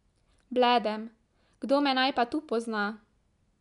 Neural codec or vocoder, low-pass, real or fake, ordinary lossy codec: none; 10.8 kHz; real; MP3, 96 kbps